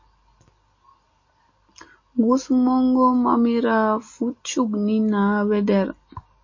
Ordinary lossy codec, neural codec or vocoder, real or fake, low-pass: MP3, 32 kbps; none; real; 7.2 kHz